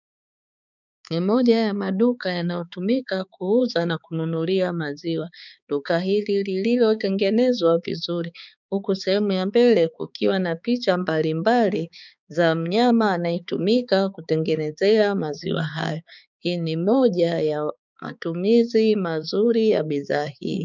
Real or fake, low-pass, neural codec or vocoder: fake; 7.2 kHz; codec, 16 kHz, 4 kbps, X-Codec, HuBERT features, trained on balanced general audio